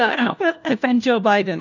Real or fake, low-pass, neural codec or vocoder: fake; 7.2 kHz; codec, 16 kHz, 1 kbps, FunCodec, trained on LibriTTS, 50 frames a second